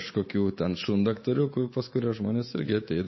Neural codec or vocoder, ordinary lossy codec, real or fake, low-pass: codec, 24 kHz, 3.1 kbps, DualCodec; MP3, 24 kbps; fake; 7.2 kHz